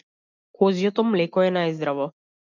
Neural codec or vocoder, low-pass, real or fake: none; 7.2 kHz; real